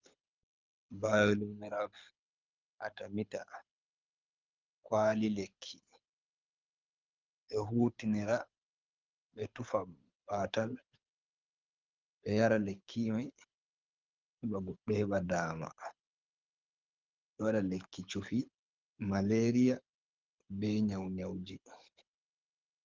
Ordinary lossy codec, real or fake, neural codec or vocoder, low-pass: Opus, 32 kbps; fake; codec, 24 kHz, 6 kbps, HILCodec; 7.2 kHz